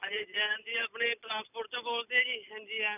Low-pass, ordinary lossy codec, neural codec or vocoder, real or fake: 3.6 kHz; none; none; real